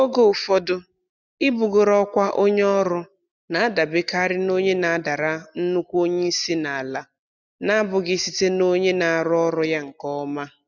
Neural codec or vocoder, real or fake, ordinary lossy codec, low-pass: none; real; none; 7.2 kHz